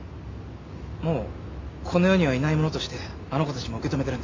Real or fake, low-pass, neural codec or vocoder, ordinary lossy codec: real; 7.2 kHz; none; AAC, 32 kbps